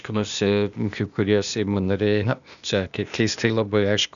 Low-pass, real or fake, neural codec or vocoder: 7.2 kHz; fake; codec, 16 kHz, 0.8 kbps, ZipCodec